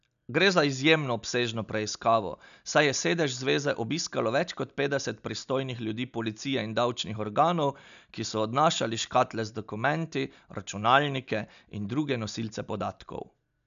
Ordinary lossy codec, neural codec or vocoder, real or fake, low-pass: none; none; real; 7.2 kHz